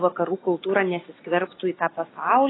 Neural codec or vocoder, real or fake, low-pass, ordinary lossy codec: none; real; 7.2 kHz; AAC, 16 kbps